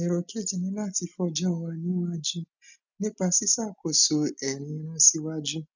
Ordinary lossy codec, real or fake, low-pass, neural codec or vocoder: none; real; 7.2 kHz; none